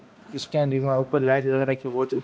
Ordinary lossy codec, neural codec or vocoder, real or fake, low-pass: none; codec, 16 kHz, 1 kbps, X-Codec, HuBERT features, trained on balanced general audio; fake; none